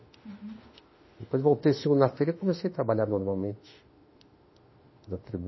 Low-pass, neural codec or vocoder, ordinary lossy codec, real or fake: 7.2 kHz; autoencoder, 48 kHz, 32 numbers a frame, DAC-VAE, trained on Japanese speech; MP3, 24 kbps; fake